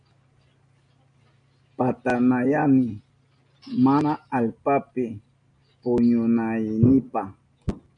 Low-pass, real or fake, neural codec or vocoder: 9.9 kHz; real; none